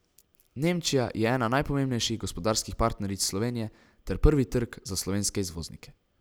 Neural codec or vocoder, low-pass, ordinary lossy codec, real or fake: none; none; none; real